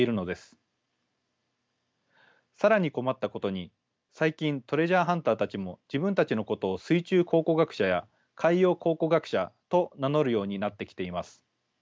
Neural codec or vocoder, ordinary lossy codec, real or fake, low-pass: none; none; real; none